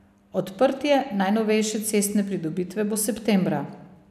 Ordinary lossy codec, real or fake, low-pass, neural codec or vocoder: none; real; 14.4 kHz; none